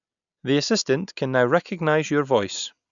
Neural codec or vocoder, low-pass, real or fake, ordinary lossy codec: none; 7.2 kHz; real; none